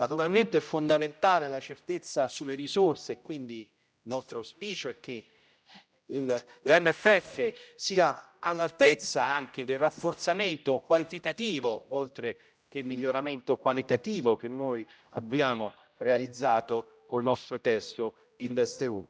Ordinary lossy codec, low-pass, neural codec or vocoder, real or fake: none; none; codec, 16 kHz, 0.5 kbps, X-Codec, HuBERT features, trained on general audio; fake